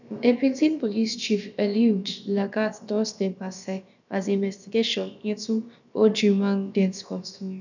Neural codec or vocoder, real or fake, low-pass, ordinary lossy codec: codec, 16 kHz, about 1 kbps, DyCAST, with the encoder's durations; fake; 7.2 kHz; none